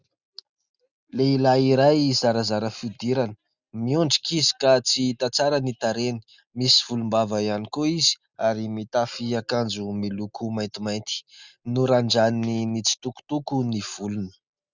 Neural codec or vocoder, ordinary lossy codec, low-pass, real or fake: none; Opus, 64 kbps; 7.2 kHz; real